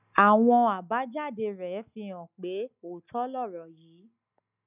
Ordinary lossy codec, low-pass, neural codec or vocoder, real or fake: none; 3.6 kHz; none; real